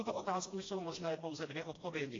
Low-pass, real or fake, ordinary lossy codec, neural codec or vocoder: 7.2 kHz; fake; AAC, 32 kbps; codec, 16 kHz, 1 kbps, FreqCodec, smaller model